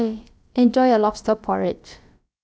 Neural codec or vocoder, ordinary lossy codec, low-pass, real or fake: codec, 16 kHz, about 1 kbps, DyCAST, with the encoder's durations; none; none; fake